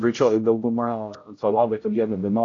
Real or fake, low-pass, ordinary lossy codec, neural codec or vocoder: fake; 7.2 kHz; AAC, 32 kbps; codec, 16 kHz, 0.5 kbps, X-Codec, HuBERT features, trained on general audio